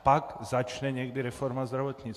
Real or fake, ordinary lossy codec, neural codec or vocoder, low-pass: real; AAC, 64 kbps; none; 14.4 kHz